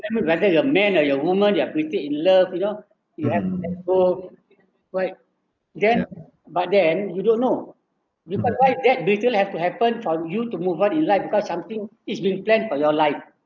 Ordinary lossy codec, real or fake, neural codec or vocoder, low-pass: none; real; none; 7.2 kHz